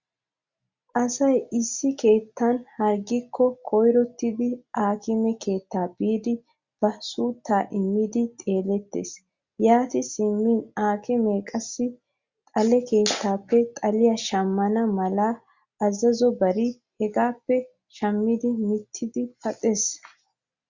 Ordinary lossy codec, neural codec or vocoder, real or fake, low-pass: Opus, 64 kbps; none; real; 7.2 kHz